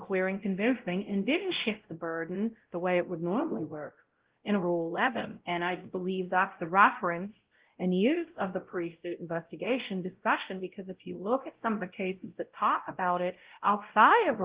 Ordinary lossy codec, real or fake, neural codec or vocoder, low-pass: Opus, 16 kbps; fake; codec, 16 kHz, 0.5 kbps, X-Codec, WavLM features, trained on Multilingual LibriSpeech; 3.6 kHz